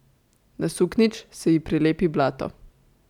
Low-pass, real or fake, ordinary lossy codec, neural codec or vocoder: 19.8 kHz; real; none; none